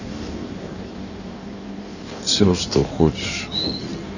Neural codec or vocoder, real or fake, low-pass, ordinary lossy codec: codec, 16 kHz in and 24 kHz out, 1.1 kbps, FireRedTTS-2 codec; fake; 7.2 kHz; none